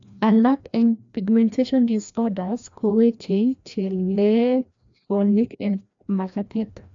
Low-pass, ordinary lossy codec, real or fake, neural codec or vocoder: 7.2 kHz; AAC, 64 kbps; fake; codec, 16 kHz, 1 kbps, FreqCodec, larger model